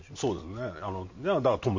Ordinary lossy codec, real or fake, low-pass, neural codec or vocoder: MP3, 48 kbps; real; 7.2 kHz; none